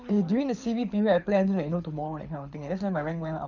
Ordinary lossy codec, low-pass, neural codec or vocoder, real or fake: none; 7.2 kHz; codec, 24 kHz, 6 kbps, HILCodec; fake